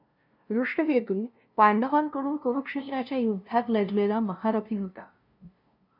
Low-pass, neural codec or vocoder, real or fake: 5.4 kHz; codec, 16 kHz, 0.5 kbps, FunCodec, trained on LibriTTS, 25 frames a second; fake